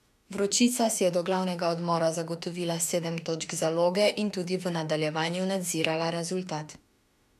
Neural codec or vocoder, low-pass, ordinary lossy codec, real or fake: autoencoder, 48 kHz, 32 numbers a frame, DAC-VAE, trained on Japanese speech; 14.4 kHz; none; fake